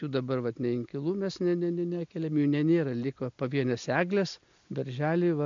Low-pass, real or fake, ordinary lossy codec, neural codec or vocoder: 7.2 kHz; real; MP3, 64 kbps; none